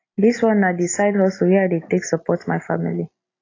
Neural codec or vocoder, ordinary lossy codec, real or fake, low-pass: none; AAC, 32 kbps; real; 7.2 kHz